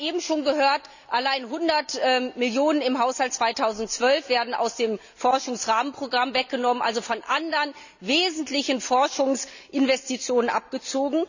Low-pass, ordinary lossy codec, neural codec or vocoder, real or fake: 7.2 kHz; none; none; real